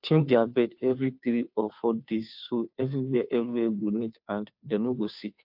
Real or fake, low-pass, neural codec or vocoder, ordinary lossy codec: fake; 5.4 kHz; codec, 16 kHz, 2 kbps, FunCodec, trained on Chinese and English, 25 frames a second; AAC, 48 kbps